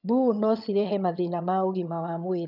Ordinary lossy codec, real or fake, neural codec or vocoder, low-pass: none; fake; vocoder, 22.05 kHz, 80 mel bands, HiFi-GAN; 5.4 kHz